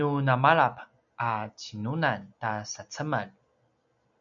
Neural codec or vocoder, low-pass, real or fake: none; 7.2 kHz; real